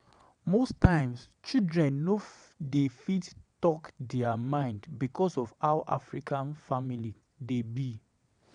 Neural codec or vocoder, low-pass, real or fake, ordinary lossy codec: vocoder, 22.05 kHz, 80 mel bands, WaveNeXt; 9.9 kHz; fake; none